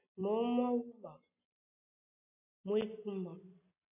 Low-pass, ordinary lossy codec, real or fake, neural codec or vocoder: 3.6 kHz; AAC, 32 kbps; real; none